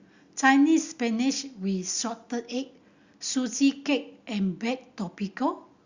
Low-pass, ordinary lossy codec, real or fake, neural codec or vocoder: 7.2 kHz; Opus, 64 kbps; real; none